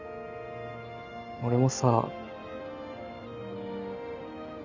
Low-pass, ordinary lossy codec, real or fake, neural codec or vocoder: 7.2 kHz; Opus, 64 kbps; real; none